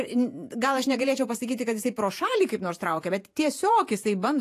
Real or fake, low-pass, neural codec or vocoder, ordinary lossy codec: fake; 14.4 kHz; vocoder, 48 kHz, 128 mel bands, Vocos; AAC, 64 kbps